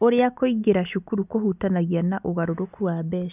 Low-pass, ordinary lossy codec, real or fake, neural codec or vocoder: 3.6 kHz; none; real; none